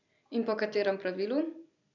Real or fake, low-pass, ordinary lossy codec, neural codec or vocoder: real; 7.2 kHz; none; none